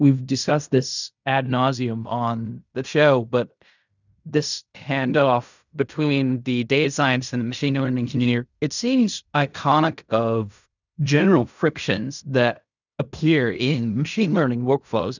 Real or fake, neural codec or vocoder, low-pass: fake; codec, 16 kHz in and 24 kHz out, 0.4 kbps, LongCat-Audio-Codec, fine tuned four codebook decoder; 7.2 kHz